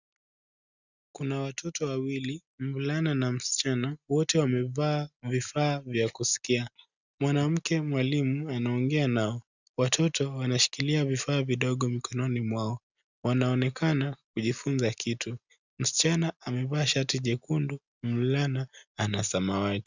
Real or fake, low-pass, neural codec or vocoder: real; 7.2 kHz; none